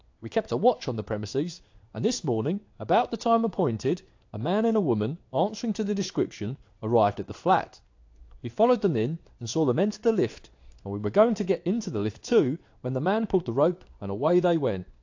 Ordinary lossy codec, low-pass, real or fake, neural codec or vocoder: AAC, 48 kbps; 7.2 kHz; fake; codec, 16 kHz in and 24 kHz out, 1 kbps, XY-Tokenizer